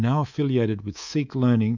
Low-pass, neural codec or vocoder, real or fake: 7.2 kHz; codec, 24 kHz, 3.1 kbps, DualCodec; fake